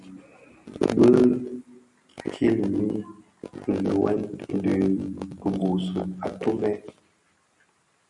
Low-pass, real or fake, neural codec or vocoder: 10.8 kHz; real; none